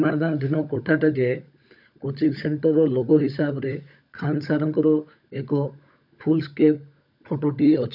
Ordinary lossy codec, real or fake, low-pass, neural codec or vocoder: none; fake; 5.4 kHz; codec, 16 kHz, 16 kbps, FunCodec, trained on LibriTTS, 50 frames a second